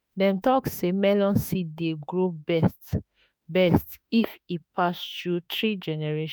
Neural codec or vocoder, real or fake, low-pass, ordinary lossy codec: autoencoder, 48 kHz, 32 numbers a frame, DAC-VAE, trained on Japanese speech; fake; none; none